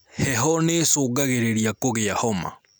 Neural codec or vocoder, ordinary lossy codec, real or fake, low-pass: none; none; real; none